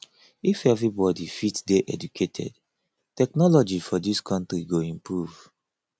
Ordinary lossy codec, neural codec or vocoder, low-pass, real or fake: none; none; none; real